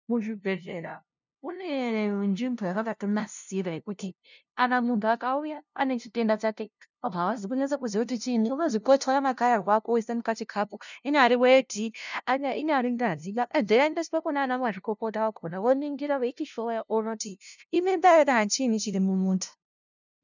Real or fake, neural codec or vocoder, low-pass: fake; codec, 16 kHz, 0.5 kbps, FunCodec, trained on LibriTTS, 25 frames a second; 7.2 kHz